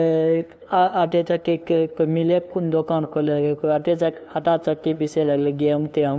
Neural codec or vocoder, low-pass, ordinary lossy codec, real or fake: codec, 16 kHz, 2 kbps, FunCodec, trained on LibriTTS, 25 frames a second; none; none; fake